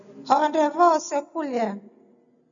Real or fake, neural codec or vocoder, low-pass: real; none; 7.2 kHz